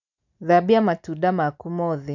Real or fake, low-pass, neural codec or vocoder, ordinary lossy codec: real; 7.2 kHz; none; none